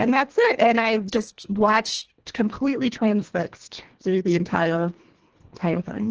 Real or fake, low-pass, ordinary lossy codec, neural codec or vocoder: fake; 7.2 kHz; Opus, 16 kbps; codec, 24 kHz, 1.5 kbps, HILCodec